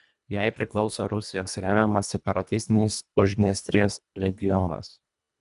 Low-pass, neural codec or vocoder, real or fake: 10.8 kHz; codec, 24 kHz, 1.5 kbps, HILCodec; fake